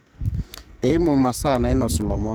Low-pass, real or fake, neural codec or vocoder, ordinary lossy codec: none; fake; codec, 44.1 kHz, 2.6 kbps, SNAC; none